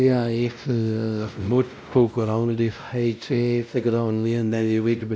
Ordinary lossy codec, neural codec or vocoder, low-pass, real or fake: none; codec, 16 kHz, 0.5 kbps, X-Codec, WavLM features, trained on Multilingual LibriSpeech; none; fake